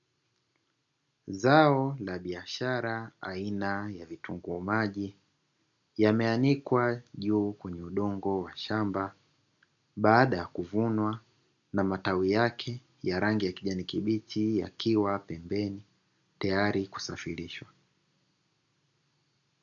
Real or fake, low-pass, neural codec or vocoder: real; 7.2 kHz; none